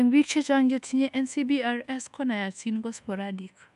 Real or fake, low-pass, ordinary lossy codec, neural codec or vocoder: fake; 10.8 kHz; AAC, 96 kbps; codec, 24 kHz, 1.2 kbps, DualCodec